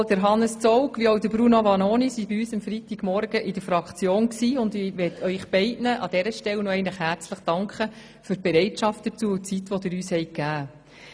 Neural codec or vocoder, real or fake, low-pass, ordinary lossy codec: none; real; none; none